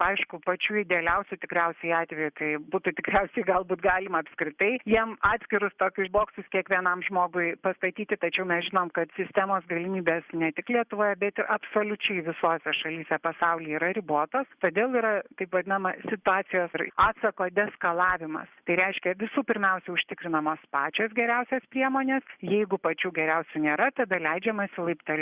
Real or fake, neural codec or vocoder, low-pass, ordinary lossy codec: real; none; 3.6 kHz; Opus, 64 kbps